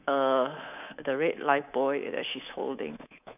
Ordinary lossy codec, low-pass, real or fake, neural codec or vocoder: none; 3.6 kHz; fake; codec, 24 kHz, 3.1 kbps, DualCodec